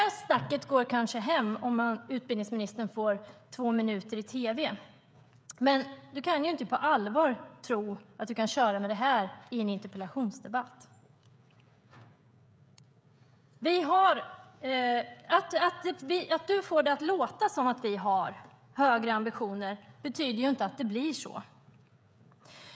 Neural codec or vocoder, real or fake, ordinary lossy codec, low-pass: codec, 16 kHz, 16 kbps, FreqCodec, smaller model; fake; none; none